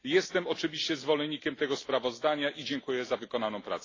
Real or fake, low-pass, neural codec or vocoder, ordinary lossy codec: real; 7.2 kHz; none; AAC, 32 kbps